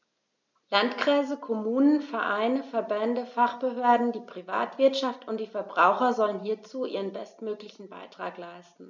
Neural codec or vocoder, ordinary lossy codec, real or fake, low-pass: none; none; real; 7.2 kHz